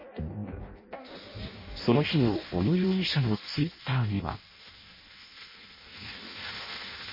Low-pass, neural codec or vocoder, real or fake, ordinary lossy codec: 5.4 kHz; codec, 16 kHz in and 24 kHz out, 0.6 kbps, FireRedTTS-2 codec; fake; MP3, 24 kbps